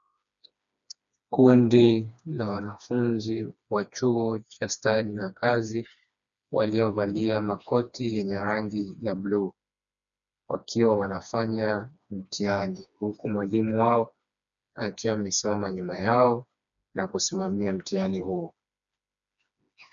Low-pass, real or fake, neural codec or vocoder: 7.2 kHz; fake; codec, 16 kHz, 2 kbps, FreqCodec, smaller model